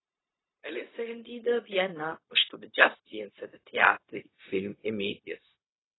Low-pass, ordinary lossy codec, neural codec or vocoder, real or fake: 7.2 kHz; AAC, 16 kbps; codec, 16 kHz, 0.4 kbps, LongCat-Audio-Codec; fake